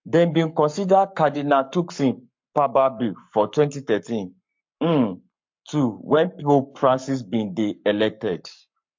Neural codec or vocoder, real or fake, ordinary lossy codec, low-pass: codec, 44.1 kHz, 7.8 kbps, Pupu-Codec; fake; MP3, 48 kbps; 7.2 kHz